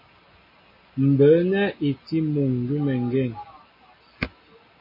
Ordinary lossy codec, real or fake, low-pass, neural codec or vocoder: MP3, 24 kbps; real; 5.4 kHz; none